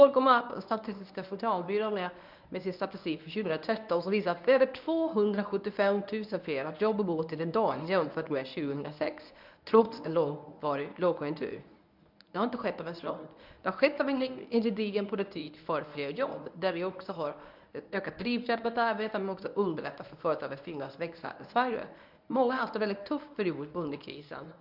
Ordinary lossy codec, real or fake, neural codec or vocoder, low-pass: none; fake; codec, 24 kHz, 0.9 kbps, WavTokenizer, medium speech release version 1; 5.4 kHz